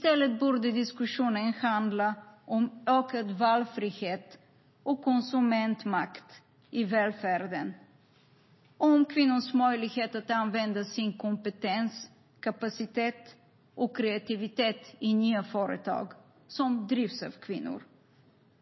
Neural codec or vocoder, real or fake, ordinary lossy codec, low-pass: none; real; MP3, 24 kbps; 7.2 kHz